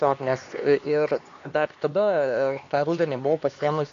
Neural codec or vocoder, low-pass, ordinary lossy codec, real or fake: codec, 16 kHz, 2 kbps, X-Codec, HuBERT features, trained on LibriSpeech; 7.2 kHz; AAC, 48 kbps; fake